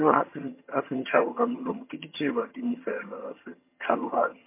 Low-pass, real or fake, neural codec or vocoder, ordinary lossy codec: 3.6 kHz; fake; vocoder, 22.05 kHz, 80 mel bands, HiFi-GAN; MP3, 24 kbps